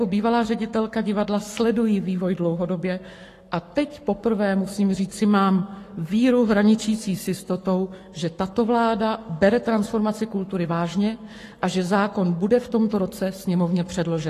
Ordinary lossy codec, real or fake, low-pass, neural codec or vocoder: AAC, 48 kbps; fake; 14.4 kHz; codec, 44.1 kHz, 7.8 kbps, Pupu-Codec